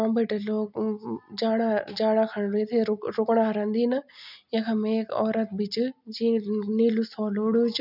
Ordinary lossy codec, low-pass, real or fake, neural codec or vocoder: none; 5.4 kHz; real; none